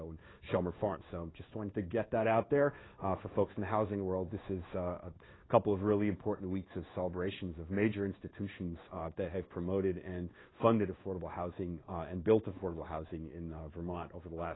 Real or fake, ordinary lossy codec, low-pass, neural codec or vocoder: fake; AAC, 16 kbps; 7.2 kHz; codec, 16 kHz in and 24 kHz out, 1 kbps, XY-Tokenizer